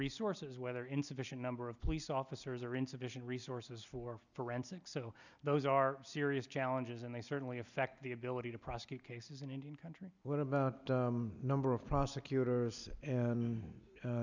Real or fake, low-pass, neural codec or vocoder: real; 7.2 kHz; none